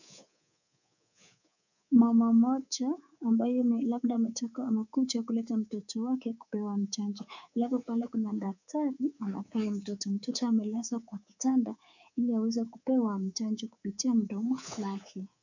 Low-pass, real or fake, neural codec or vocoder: 7.2 kHz; fake; codec, 24 kHz, 3.1 kbps, DualCodec